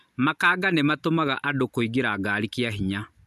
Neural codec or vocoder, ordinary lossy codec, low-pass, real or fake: none; none; 14.4 kHz; real